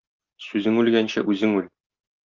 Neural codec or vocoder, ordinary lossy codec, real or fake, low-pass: none; Opus, 24 kbps; real; 7.2 kHz